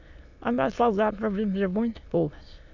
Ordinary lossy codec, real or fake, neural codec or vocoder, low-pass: none; fake; autoencoder, 22.05 kHz, a latent of 192 numbers a frame, VITS, trained on many speakers; 7.2 kHz